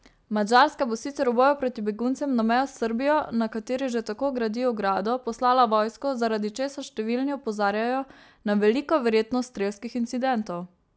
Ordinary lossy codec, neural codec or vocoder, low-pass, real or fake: none; none; none; real